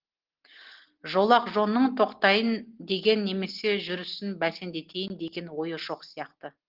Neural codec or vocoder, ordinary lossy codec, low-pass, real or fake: none; Opus, 16 kbps; 5.4 kHz; real